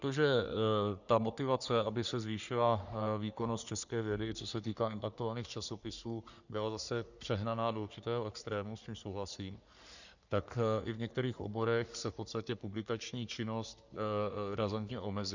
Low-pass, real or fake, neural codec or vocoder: 7.2 kHz; fake; codec, 44.1 kHz, 3.4 kbps, Pupu-Codec